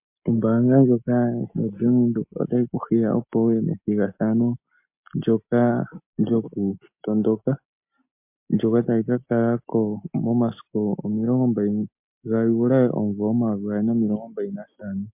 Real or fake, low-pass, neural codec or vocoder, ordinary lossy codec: real; 3.6 kHz; none; MP3, 32 kbps